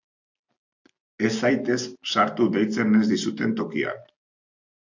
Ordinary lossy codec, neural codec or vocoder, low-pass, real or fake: AAC, 48 kbps; none; 7.2 kHz; real